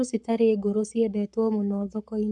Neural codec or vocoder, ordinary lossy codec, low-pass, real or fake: codec, 44.1 kHz, 7.8 kbps, Pupu-Codec; none; 10.8 kHz; fake